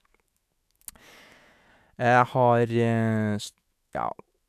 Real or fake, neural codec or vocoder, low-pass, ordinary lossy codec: fake; autoencoder, 48 kHz, 128 numbers a frame, DAC-VAE, trained on Japanese speech; 14.4 kHz; none